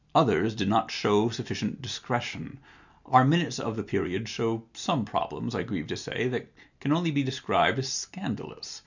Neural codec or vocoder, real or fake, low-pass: none; real; 7.2 kHz